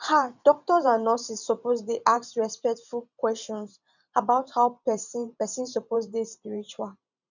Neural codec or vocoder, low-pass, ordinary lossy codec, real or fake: vocoder, 44.1 kHz, 128 mel bands every 512 samples, BigVGAN v2; 7.2 kHz; none; fake